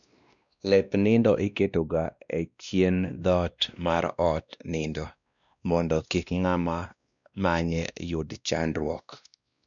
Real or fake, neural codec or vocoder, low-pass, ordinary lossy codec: fake; codec, 16 kHz, 1 kbps, X-Codec, WavLM features, trained on Multilingual LibriSpeech; 7.2 kHz; none